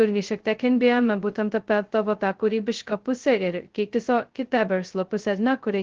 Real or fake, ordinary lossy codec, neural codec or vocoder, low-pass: fake; Opus, 16 kbps; codec, 16 kHz, 0.2 kbps, FocalCodec; 7.2 kHz